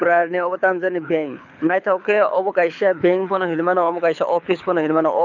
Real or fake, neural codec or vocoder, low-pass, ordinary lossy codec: fake; codec, 24 kHz, 6 kbps, HILCodec; 7.2 kHz; none